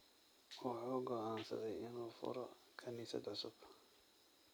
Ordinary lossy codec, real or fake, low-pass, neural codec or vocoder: none; real; none; none